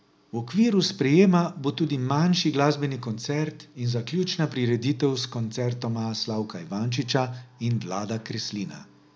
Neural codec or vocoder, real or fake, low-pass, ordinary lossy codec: none; real; none; none